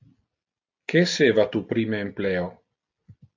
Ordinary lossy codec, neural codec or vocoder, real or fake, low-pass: AAC, 48 kbps; none; real; 7.2 kHz